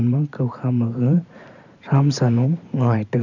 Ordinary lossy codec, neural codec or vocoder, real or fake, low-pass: none; vocoder, 44.1 kHz, 128 mel bands every 512 samples, BigVGAN v2; fake; 7.2 kHz